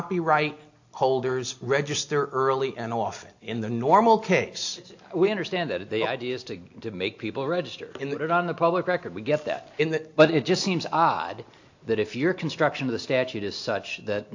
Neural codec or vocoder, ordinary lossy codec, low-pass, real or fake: none; AAC, 48 kbps; 7.2 kHz; real